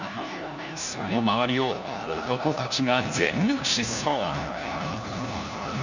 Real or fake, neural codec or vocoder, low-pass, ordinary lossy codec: fake; codec, 16 kHz, 1 kbps, FunCodec, trained on LibriTTS, 50 frames a second; 7.2 kHz; none